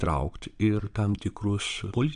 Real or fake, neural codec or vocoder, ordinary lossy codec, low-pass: fake; vocoder, 22.05 kHz, 80 mel bands, Vocos; AAC, 96 kbps; 9.9 kHz